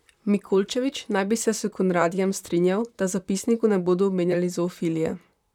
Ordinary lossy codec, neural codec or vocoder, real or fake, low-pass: none; vocoder, 44.1 kHz, 128 mel bands, Pupu-Vocoder; fake; 19.8 kHz